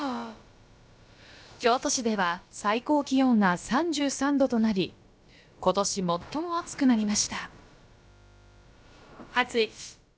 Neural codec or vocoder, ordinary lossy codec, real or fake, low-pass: codec, 16 kHz, about 1 kbps, DyCAST, with the encoder's durations; none; fake; none